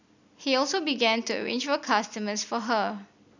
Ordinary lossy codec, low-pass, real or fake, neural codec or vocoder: none; 7.2 kHz; real; none